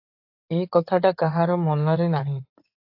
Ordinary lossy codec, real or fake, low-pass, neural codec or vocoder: AAC, 32 kbps; fake; 5.4 kHz; codec, 16 kHz in and 24 kHz out, 2.2 kbps, FireRedTTS-2 codec